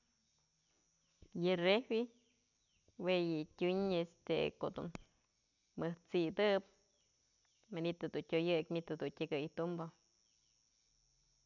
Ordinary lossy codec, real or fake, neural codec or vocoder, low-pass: none; real; none; 7.2 kHz